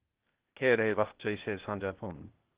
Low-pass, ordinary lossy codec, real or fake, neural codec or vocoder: 3.6 kHz; Opus, 16 kbps; fake; codec, 16 kHz, 0.8 kbps, ZipCodec